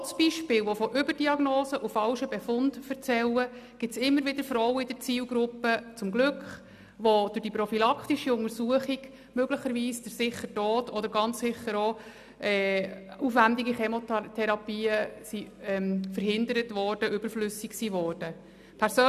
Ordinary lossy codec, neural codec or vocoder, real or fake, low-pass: none; none; real; 14.4 kHz